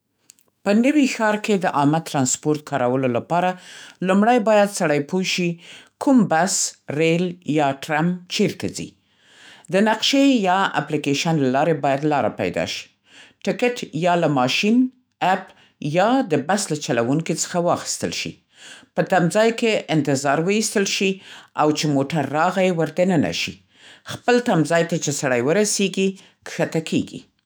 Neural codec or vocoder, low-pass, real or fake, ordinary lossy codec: autoencoder, 48 kHz, 128 numbers a frame, DAC-VAE, trained on Japanese speech; none; fake; none